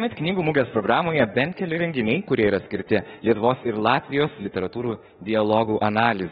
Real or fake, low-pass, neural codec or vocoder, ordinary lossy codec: fake; 19.8 kHz; autoencoder, 48 kHz, 32 numbers a frame, DAC-VAE, trained on Japanese speech; AAC, 16 kbps